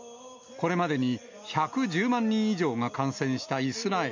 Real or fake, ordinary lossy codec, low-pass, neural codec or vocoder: real; MP3, 64 kbps; 7.2 kHz; none